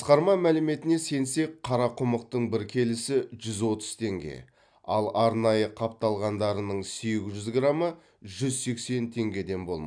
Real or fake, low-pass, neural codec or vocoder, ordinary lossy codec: real; 9.9 kHz; none; none